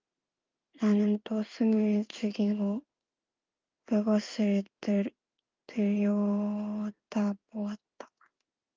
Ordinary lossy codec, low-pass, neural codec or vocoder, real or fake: Opus, 24 kbps; 7.2 kHz; codec, 16 kHz in and 24 kHz out, 1 kbps, XY-Tokenizer; fake